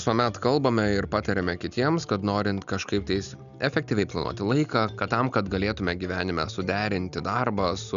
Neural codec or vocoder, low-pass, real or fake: none; 7.2 kHz; real